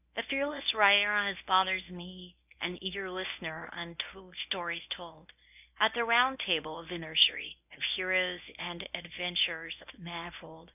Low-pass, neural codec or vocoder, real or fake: 3.6 kHz; codec, 24 kHz, 0.9 kbps, WavTokenizer, medium speech release version 1; fake